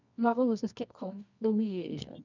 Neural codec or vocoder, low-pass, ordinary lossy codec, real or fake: codec, 24 kHz, 0.9 kbps, WavTokenizer, medium music audio release; 7.2 kHz; none; fake